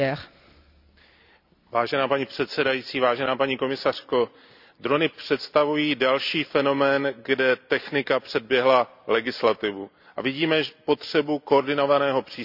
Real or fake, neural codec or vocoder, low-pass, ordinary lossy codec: real; none; 5.4 kHz; none